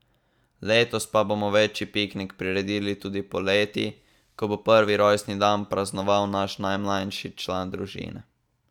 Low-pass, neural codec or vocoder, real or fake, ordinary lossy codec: 19.8 kHz; none; real; none